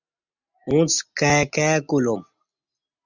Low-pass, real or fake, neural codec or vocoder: 7.2 kHz; real; none